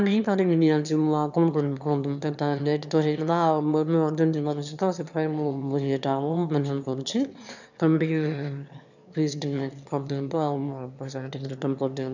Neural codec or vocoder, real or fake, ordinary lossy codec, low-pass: autoencoder, 22.05 kHz, a latent of 192 numbers a frame, VITS, trained on one speaker; fake; none; 7.2 kHz